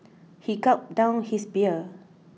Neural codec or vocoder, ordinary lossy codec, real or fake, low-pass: none; none; real; none